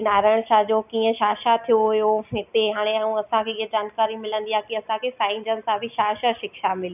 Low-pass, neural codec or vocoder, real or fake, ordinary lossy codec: 3.6 kHz; none; real; none